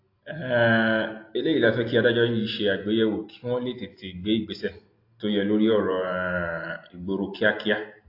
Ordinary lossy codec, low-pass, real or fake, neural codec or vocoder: AAC, 32 kbps; 5.4 kHz; real; none